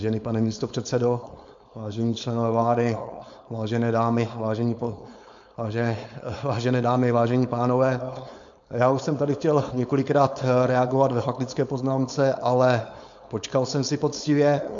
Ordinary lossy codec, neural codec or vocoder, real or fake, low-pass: MP3, 64 kbps; codec, 16 kHz, 4.8 kbps, FACodec; fake; 7.2 kHz